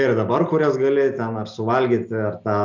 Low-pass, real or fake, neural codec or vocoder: 7.2 kHz; real; none